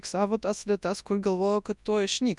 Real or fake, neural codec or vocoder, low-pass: fake; codec, 24 kHz, 0.9 kbps, WavTokenizer, large speech release; 10.8 kHz